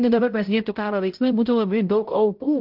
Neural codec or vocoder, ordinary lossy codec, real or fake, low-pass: codec, 16 kHz, 0.5 kbps, X-Codec, HuBERT features, trained on balanced general audio; Opus, 16 kbps; fake; 5.4 kHz